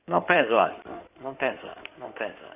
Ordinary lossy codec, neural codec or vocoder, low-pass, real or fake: none; codec, 16 kHz in and 24 kHz out, 2.2 kbps, FireRedTTS-2 codec; 3.6 kHz; fake